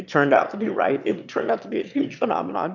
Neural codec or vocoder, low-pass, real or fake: autoencoder, 22.05 kHz, a latent of 192 numbers a frame, VITS, trained on one speaker; 7.2 kHz; fake